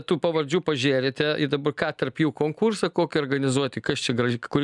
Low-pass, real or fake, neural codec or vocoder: 10.8 kHz; real; none